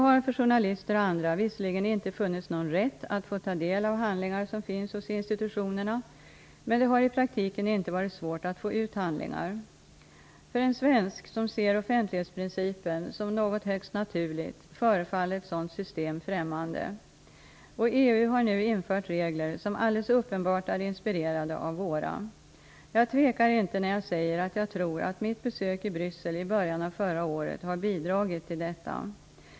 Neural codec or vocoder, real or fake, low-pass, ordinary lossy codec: none; real; none; none